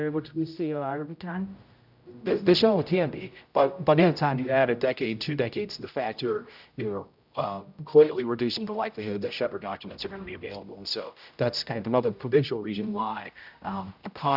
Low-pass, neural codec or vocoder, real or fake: 5.4 kHz; codec, 16 kHz, 0.5 kbps, X-Codec, HuBERT features, trained on general audio; fake